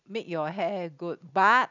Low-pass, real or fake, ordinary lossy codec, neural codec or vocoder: 7.2 kHz; real; none; none